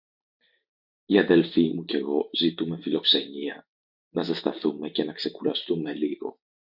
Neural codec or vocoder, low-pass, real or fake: none; 5.4 kHz; real